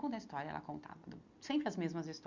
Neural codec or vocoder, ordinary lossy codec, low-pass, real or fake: none; Opus, 64 kbps; 7.2 kHz; real